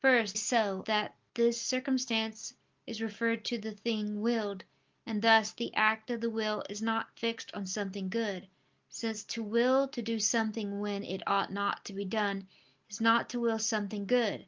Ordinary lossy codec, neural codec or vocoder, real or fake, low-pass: Opus, 32 kbps; none; real; 7.2 kHz